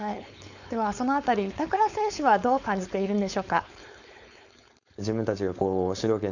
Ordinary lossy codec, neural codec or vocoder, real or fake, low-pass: none; codec, 16 kHz, 4.8 kbps, FACodec; fake; 7.2 kHz